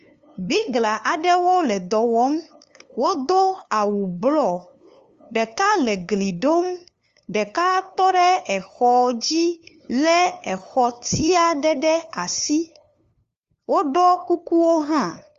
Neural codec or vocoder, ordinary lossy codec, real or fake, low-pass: codec, 16 kHz, 2 kbps, FunCodec, trained on LibriTTS, 25 frames a second; Opus, 32 kbps; fake; 7.2 kHz